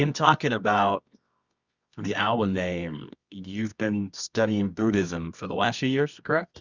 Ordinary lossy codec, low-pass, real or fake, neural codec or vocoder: Opus, 64 kbps; 7.2 kHz; fake; codec, 24 kHz, 0.9 kbps, WavTokenizer, medium music audio release